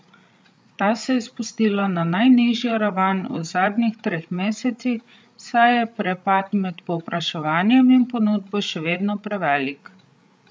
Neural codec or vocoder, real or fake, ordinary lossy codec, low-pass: codec, 16 kHz, 8 kbps, FreqCodec, larger model; fake; none; none